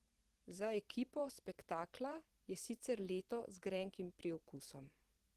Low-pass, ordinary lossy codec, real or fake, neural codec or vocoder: 14.4 kHz; Opus, 16 kbps; fake; vocoder, 44.1 kHz, 128 mel bands every 512 samples, BigVGAN v2